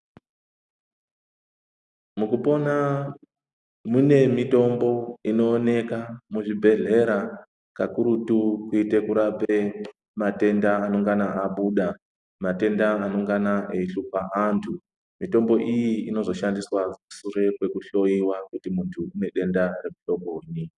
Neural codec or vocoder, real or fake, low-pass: none; real; 10.8 kHz